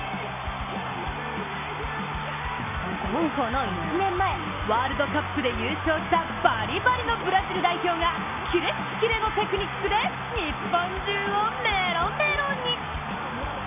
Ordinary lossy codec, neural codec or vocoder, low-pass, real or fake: none; none; 3.6 kHz; real